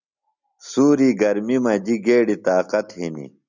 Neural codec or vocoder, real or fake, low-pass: none; real; 7.2 kHz